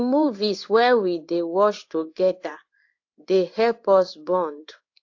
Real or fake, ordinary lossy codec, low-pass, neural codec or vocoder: fake; AAC, 48 kbps; 7.2 kHz; codec, 16 kHz in and 24 kHz out, 1 kbps, XY-Tokenizer